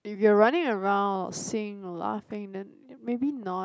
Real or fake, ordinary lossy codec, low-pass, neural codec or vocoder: real; none; none; none